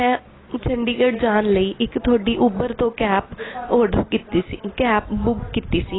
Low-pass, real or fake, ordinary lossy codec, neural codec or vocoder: 7.2 kHz; real; AAC, 16 kbps; none